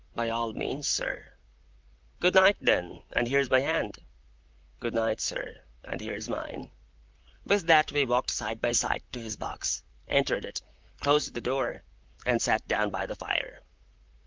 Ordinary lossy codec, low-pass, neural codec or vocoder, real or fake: Opus, 32 kbps; 7.2 kHz; vocoder, 44.1 kHz, 128 mel bands, Pupu-Vocoder; fake